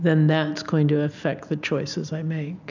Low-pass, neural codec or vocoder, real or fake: 7.2 kHz; none; real